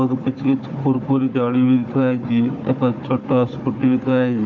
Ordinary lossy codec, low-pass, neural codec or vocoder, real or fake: MP3, 48 kbps; 7.2 kHz; codec, 16 kHz, 4 kbps, FunCodec, trained on Chinese and English, 50 frames a second; fake